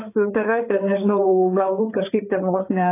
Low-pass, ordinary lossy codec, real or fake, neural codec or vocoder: 3.6 kHz; AAC, 24 kbps; fake; codec, 16 kHz, 4 kbps, FreqCodec, larger model